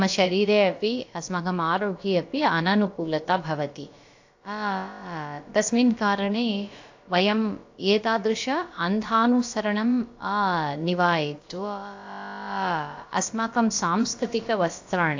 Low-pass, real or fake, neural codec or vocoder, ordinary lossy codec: 7.2 kHz; fake; codec, 16 kHz, about 1 kbps, DyCAST, with the encoder's durations; AAC, 48 kbps